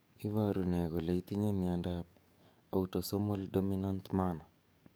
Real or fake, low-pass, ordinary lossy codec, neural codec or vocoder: fake; none; none; codec, 44.1 kHz, 7.8 kbps, DAC